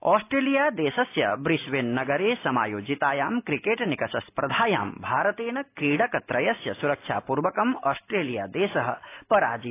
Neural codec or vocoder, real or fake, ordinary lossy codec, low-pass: none; real; AAC, 24 kbps; 3.6 kHz